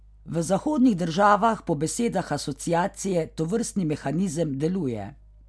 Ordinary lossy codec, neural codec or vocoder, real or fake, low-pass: none; none; real; none